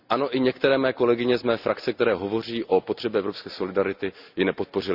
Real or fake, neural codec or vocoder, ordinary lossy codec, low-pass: real; none; none; 5.4 kHz